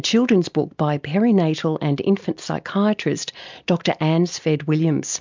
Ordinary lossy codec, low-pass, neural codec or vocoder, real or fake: MP3, 64 kbps; 7.2 kHz; none; real